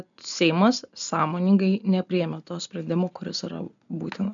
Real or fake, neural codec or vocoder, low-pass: real; none; 7.2 kHz